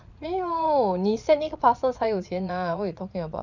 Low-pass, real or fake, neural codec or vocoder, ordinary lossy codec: 7.2 kHz; fake; vocoder, 22.05 kHz, 80 mel bands, Vocos; none